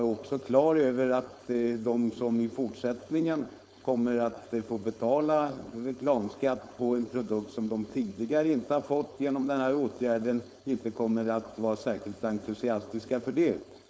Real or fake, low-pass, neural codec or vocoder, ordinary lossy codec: fake; none; codec, 16 kHz, 4.8 kbps, FACodec; none